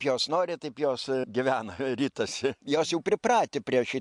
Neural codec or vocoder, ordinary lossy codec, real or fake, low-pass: none; MP3, 64 kbps; real; 10.8 kHz